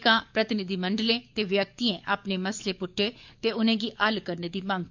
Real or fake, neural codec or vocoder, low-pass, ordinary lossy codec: fake; codec, 44.1 kHz, 7.8 kbps, DAC; 7.2 kHz; MP3, 64 kbps